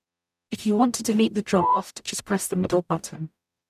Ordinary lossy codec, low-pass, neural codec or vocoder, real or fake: none; 14.4 kHz; codec, 44.1 kHz, 0.9 kbps, DAC; fake